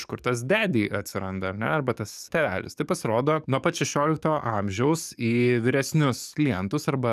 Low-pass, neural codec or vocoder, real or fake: 14.4 kHz; codec, 44.1 kHz, 7.8 kbps, DAC; fake